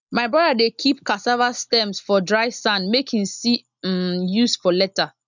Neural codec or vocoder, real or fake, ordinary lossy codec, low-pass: none; real; none; 7.2 kHz